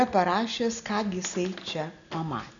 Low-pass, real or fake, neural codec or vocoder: 7.2 kHz; real; none